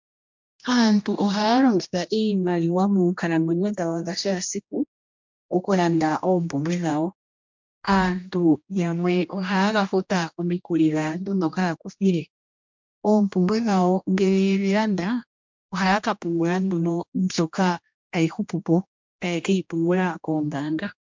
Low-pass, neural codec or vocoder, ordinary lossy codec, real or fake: 7.2 kHz; codec, 16 kHz, 1 kbps, X-Codec, HuBERT features, trained on general audio; MP3, 64 kbps; fake